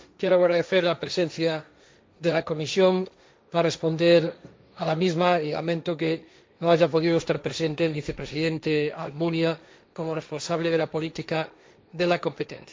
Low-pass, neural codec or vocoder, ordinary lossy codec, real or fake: none; codec, 16 kHz, 1.1 kbps, Voila-Tokenizer; none; fake